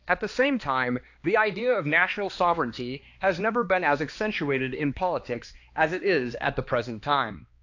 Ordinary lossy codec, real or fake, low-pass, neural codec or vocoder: AAC, 48 kbps; fake; 7.2 kHz; codec, 16 kHz, 2 kbps, X-Codec, HuBERT features, trained on balanced general audio